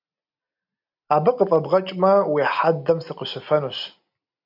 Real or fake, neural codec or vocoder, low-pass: real; none; 5.4 kHz